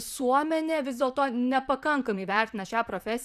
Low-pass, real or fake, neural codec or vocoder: 14.4 kHz; fake; vocoder, 44.1 kHz, 128 mel bands, Pupu-Vocoder